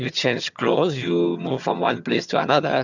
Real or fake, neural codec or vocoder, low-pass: fake; vocoder, 22.05 kHz, 80 mel bands, HiFi-GAN; 7.2 kHz